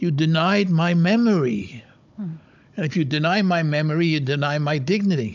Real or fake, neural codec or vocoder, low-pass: fake; codec, 16 kHz, 16 kbps, FunCodec, trained on Chinese and English, 50 frames a second; 7.2 kHz